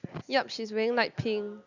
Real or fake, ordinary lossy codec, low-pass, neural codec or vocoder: real; none; 7.2 kHz; none